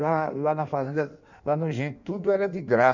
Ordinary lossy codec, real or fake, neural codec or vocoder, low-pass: none; fake; codec, 16 kHz in and 24 kHz out, 1.1 kbps, FireRedTTS-2 codec; 7.2 kHz